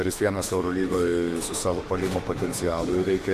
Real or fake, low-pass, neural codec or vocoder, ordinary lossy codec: fake; 14.4 kHz; autoencoder, 48 kHz, 32 numbers a frame, DAC-VAE, trained on Japanese speech; AAC, 64 kbps